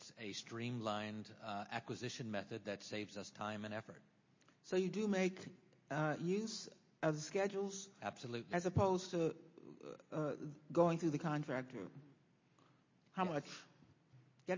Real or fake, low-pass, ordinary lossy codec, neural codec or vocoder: real; 7.2 kHz; MP3, 32 kbps; none